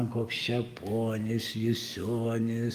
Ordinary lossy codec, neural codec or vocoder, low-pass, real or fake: Opus, 32 kbps; codec, 44.1 kHz, 7.8 kbps, DAC; 14.4 kHz; fake